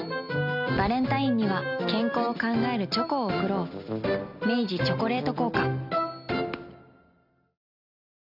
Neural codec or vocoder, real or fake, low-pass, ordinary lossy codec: none; real; 5.4 kHz; none